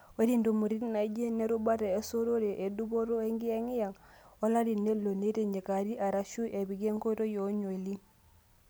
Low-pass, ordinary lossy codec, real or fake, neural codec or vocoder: none; none; real; none